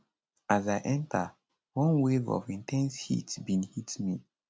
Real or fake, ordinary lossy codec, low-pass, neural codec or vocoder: real; none; none; none